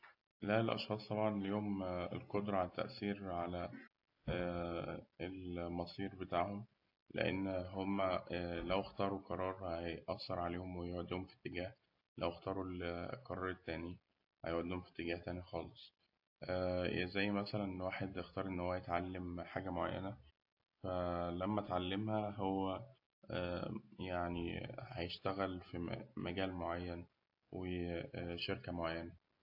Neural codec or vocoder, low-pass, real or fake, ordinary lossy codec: none; 5.4 kHz; real; AAC, 48 kbps